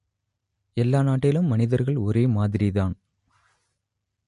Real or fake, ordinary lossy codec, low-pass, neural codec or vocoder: real; MP3, 48 kbps; 14.4 kHz; none